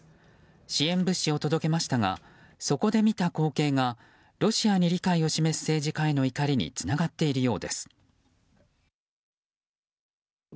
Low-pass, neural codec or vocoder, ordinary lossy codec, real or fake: none; none; none; real